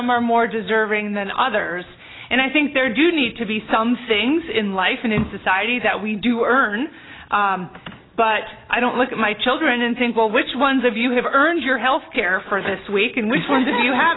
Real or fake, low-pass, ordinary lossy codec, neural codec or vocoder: real; 7.2 kHz; AAC, 16 kbps; none